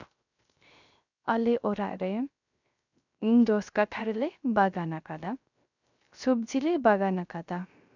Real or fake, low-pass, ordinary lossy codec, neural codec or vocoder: fake; 7.2 kHz; none; codec, 16 kHz, 0.3 kbps, FocalCodec